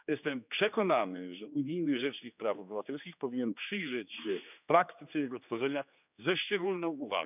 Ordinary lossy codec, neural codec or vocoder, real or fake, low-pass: none; codec, 16 kHz, 2 kbps, X-Codec, HuBERT features, trained on general audio; fake; 3.6 kHz